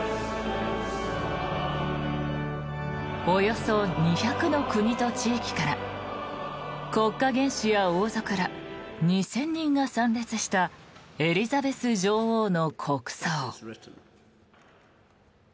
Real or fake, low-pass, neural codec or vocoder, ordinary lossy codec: real; none; none; none